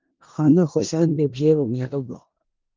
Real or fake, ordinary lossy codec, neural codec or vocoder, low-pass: fake; Opus, 24 kbps; codec, 16 kHz in and 24 kHz out, 0.4 kbps, LongCat-Audio-Codec, four codebook decoder; 7.2 kHz